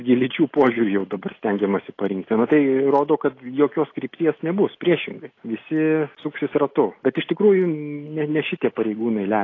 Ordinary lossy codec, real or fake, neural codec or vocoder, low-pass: AAC, 32 kbps; real; none; 7.2 kHz